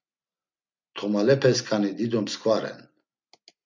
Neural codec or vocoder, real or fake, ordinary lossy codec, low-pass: none; real; MP3, 64 kbps; 7.2 kHz